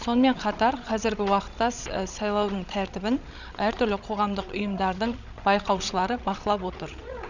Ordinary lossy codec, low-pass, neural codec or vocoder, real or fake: none; 7.2 kHz; codec, 16 kHz, 16 kbps, FreqCodec, larger model; fake